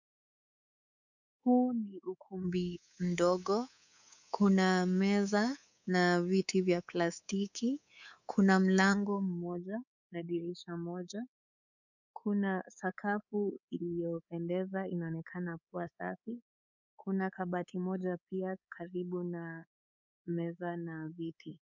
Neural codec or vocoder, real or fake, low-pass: codec, 24 kHz, 3.1 kbps, DualCodec; fake; 7.2 kHz